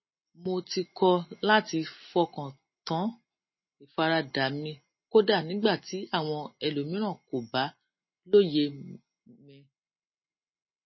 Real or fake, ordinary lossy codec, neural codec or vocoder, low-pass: real; MP3, 24 kbps; none; 7.2 kHz